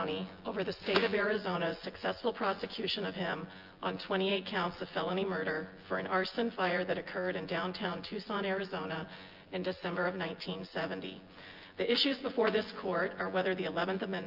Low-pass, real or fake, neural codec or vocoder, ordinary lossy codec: 5.4 kHz; fake; vocoder, 24 kHz, 100 mel bands, Vocos; Opus, 24 kbps